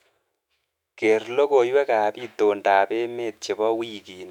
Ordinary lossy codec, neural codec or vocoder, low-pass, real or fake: none; autoencoder, 48 kHz, 128 numbers a frame, DAC-VAE, trained on Japanese speech; 19.8 kHz; fake